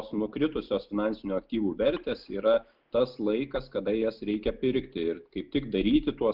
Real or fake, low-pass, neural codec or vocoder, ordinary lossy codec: real; 5.4 kHz; none; Opus, 24 kbps